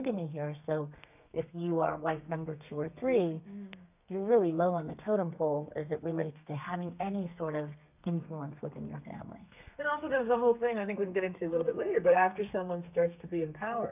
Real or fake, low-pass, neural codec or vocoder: fake; 3.6 kHz; codec, 32 kHz, 1.9 kbps, SNAC